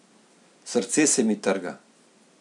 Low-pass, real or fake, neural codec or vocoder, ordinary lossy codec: 10.8 kHz; real; none; none